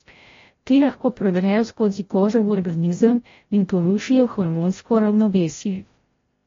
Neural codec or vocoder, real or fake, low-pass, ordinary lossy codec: codec, 16 kHz, 0.5 kbps, FreqCodec, larger model; fake; 7.2 kHz; AAC, 32 kbps